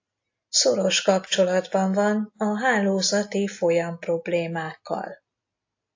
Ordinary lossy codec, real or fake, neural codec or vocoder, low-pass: AAC, 48 kbps; real; none; 7.2 kHz